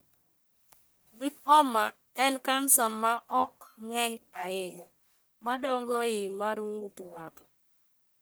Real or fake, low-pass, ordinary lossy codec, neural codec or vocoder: fake; none; none; codec, 44.1 kHz, 1.7 kbps, Pupu-Codec